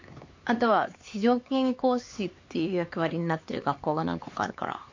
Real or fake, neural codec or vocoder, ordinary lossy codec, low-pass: fake; codec, 16 kHz, 4 kbps, X-Codec, HuBERT features, trained on LibriSpeech; MP3, 48 kbps; 7.2 kHz